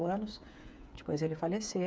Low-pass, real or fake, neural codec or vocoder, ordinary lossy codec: none; fake; codec, 16 kHz, 8 kbps, FreqCodec, smaller model; none